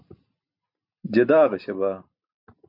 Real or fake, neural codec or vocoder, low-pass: real; none; 5.4 kHz